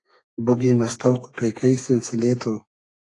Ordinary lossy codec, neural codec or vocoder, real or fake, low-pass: AAC, 48 kbps; codec, 32 kHz, 1.9 kbps, SNAC; fake; 10.8 kHz